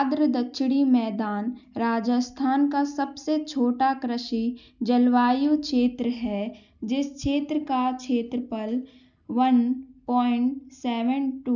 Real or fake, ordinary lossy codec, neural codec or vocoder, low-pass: real; none; none; 7.2 kHz